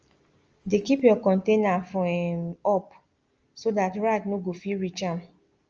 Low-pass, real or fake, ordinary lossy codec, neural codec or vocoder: 7.2 kHz; real; Opus, 24 kbps; none